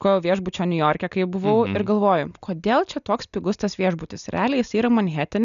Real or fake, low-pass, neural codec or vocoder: real; 7.2 kHz; none